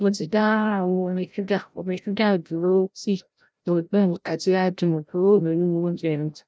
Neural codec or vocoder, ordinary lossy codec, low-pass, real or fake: codec, 16 kHz, 0.5 kbps, FreqCodec, larger model; none; none; fake